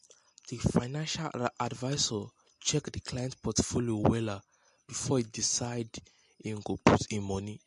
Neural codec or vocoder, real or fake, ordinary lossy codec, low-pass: none; real; MP3, 48 kbps; 14.4 kHz